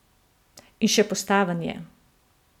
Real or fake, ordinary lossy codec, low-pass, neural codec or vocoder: real; none; 19.8 kHz; none